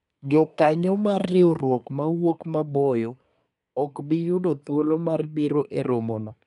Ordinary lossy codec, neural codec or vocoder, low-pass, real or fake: none; codec, 24 kHz, 1 kbps, SNAC; 10.8 kHz; fake